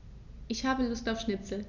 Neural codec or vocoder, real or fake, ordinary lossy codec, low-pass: none; real; none; 7.2 kHz